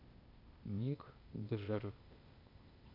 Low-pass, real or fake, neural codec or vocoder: 5.4 kHz; fake; codec, 16 kHz, 0.8 kbps, ZipCodec